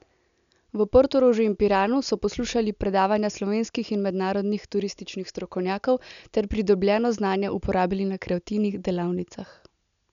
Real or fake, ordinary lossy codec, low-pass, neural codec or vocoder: real; none; 7.2 kHz; none